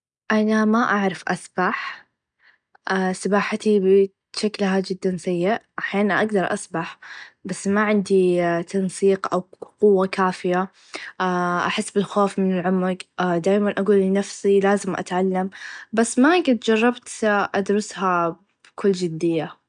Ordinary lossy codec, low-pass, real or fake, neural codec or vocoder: none; 9.9 kHz; real; none